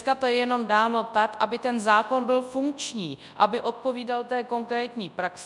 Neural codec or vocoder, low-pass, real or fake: codec, 24 kHz, 0.9 kbps, WavTokenizer, large speech release; 10.8 kHz; fake